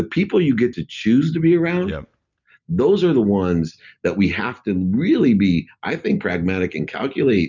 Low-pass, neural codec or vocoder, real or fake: 7.2 kHz; none; real